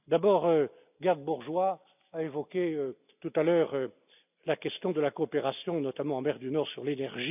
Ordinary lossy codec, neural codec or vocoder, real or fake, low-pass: none; none; real; 3.6 kHz